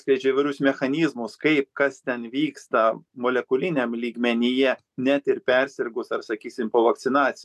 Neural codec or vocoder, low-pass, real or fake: none; 14.4 kHz; real